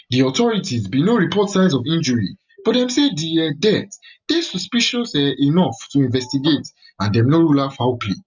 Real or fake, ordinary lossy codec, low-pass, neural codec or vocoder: real; none; 7.2 kHz; none